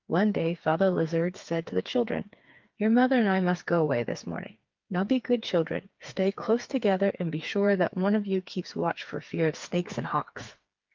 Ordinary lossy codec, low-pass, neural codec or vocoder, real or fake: Opus, 32 kbps; 7.2 kHz; codec, 16 kHz, 4 kbps, FreqCodec, smaller model; fake